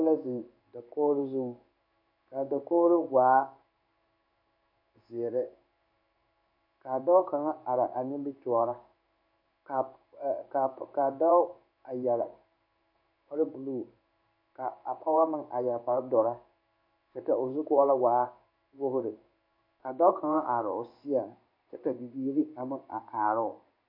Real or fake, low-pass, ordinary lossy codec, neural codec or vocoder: fake; 5.4 kHz; MP3, 32 kbps; codec, 16 kHz in and 24 kHz out, 1 kbps, XY-Tokenizer